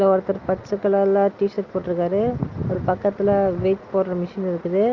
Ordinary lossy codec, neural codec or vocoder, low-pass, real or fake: Opus, 64 kbps; none; 7.2 kHz; real